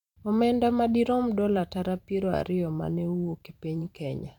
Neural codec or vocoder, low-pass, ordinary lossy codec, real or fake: vocoder, 44.1 kHz, 128 mel bands every 512 samples, BigVGAN v2; 19.8 kHz; none; fake